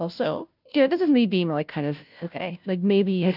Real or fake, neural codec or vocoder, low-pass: fake; codec, 16 kHz, 0.5 kbps, FunCodec, trained on Chinese and English, 25 frames a second; 5.4 kHz